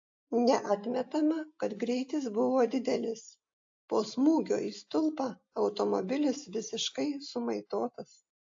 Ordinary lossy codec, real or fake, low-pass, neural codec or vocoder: AAC, 48 kbps; real; 7.2 kHz; none